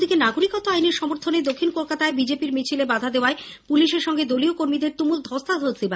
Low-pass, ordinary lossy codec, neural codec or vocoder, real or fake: none; none; none; real